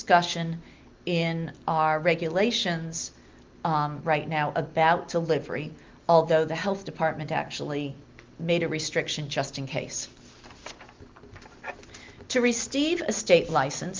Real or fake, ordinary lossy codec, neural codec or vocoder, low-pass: real; Opus, 32 kbps; none; 7.2 kHz